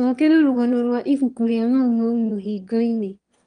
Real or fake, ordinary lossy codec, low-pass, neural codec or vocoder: fake; Opus, 32 kbps; 9.9 kHz; autoencoder, 22.05 kHz, a latent of 192 numbers a frame, VITS, trained on one speaker